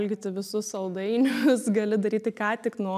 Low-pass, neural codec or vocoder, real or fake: 14.4 kHz; none; real